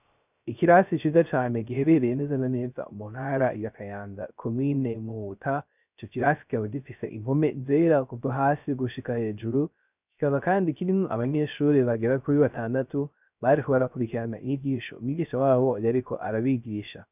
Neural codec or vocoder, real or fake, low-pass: codec, 16 kHz, 0.3 kbps, FocalCodec; fake; 3.6 kHz